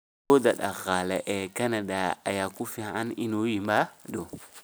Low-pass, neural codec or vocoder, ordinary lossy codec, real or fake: none; none; none; real